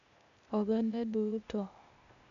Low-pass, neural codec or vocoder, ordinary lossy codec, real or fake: 7.2 kHz; codec, 16 kHz, 0.8 kbps, ZipCodec; none; fake